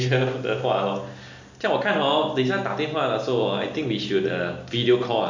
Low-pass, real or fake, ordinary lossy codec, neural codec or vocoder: 7.2 kHz; real; MP3, 64 kbps; none